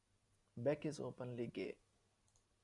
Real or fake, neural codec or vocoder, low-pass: real; none; 10.8 kHz